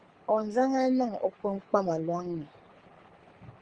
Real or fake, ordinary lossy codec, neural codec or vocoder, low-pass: fake; Opus, 24 kbps; codec, 24 kHz, 6 kbps, HILCodec; 9.9 kHz